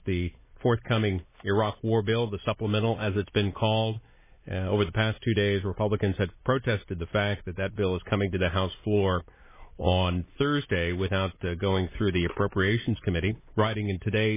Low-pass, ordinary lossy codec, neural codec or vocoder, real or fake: 3.6 kHz; MP3, 16 kbps; none; real